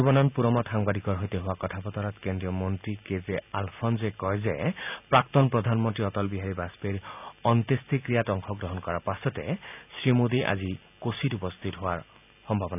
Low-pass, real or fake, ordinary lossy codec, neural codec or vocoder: 3.6 kHz; real; none; none